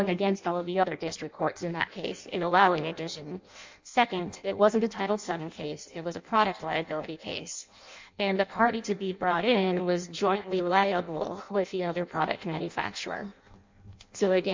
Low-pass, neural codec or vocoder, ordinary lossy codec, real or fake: 7.2 kHz; codec, 16 kHz in and 24 kHz out, 0.6 kbps, FireRedTTS-2 codec; MP3, 64 kbps; fake